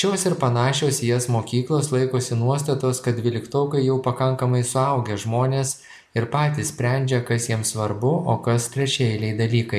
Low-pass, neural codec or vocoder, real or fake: 14.4 kHz; none; real